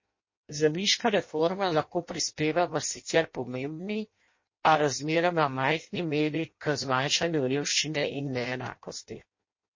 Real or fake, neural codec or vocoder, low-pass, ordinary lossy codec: fake; codec, 16 kHz in and 24 kHz out, 0.6 kbps, FireRedTTS-2 codec; 7.2 kHz; MP3, 32 kbps